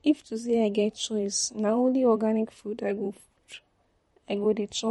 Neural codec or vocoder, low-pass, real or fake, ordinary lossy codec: vocoder, 44.1 kHz, 128 mel bands, Pupu-Vocoder; 19.8 kHz; fake; MP3, 48 kbps